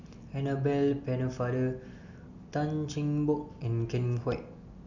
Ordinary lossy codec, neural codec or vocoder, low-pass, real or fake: none; none; 7.2 kHz; real